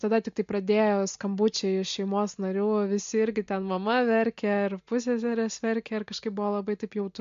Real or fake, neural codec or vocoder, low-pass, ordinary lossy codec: real; none; 7.2 kHz; MP3, 48 kbps